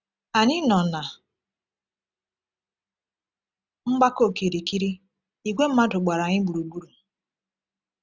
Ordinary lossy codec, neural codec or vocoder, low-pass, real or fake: none; none; none; real